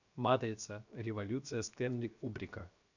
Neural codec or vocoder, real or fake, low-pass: codec, 16 kHz, 0.7 kbps, FocalCodec; fake; 7.2 kHz